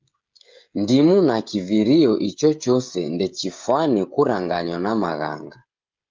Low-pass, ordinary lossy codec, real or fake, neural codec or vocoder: 7.2 kHz; Opus, 24 kbps; fake; codec, 16 kHz, 16 kbps, FreqCodec, smaller model